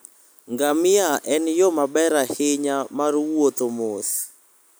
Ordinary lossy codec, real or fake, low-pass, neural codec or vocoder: none; real; none; none